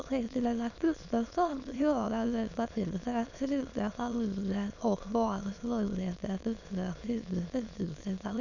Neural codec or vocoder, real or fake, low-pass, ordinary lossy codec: autoencoder, 22.05 kHz, a latent of 192 numbers a frame, VITS, trained on many speakers; fake; 7.2 kHz; none